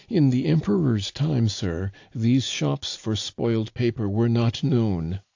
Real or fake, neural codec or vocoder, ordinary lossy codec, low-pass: real; none; AAC, 48 kbps; 7.2 kHz